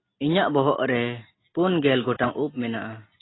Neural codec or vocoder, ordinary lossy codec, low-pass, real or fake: none; AAC, 16 kbps; 7.2 kHz; real